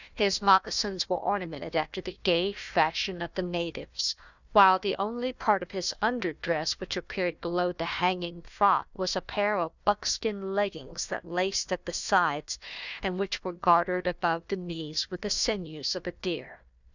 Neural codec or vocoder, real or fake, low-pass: codec, 16 kHz, 1 kbps, FunCodec, trained on Chinese and English, 50 frames a second; fake; 7.2 kHz